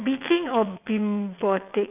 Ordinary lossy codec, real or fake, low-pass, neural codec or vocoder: none; fake; 3.6 kHz; vocoder, 22.05 kHz, 80 mel bands, WaveNeXt